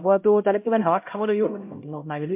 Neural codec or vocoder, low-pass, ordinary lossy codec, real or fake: codec, 16 kHz, 0.5 kbps, X-Codec, HuBERT features, trained on LibriSpeech; 3.6 kHz; MP3, 32 kbps; fake